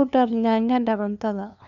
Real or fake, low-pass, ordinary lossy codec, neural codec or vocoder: fake; 7.2 kHz; none; codec, 16 kHz, 2 kbps, FunCodec, trained on LibriTTS, 25 frames a second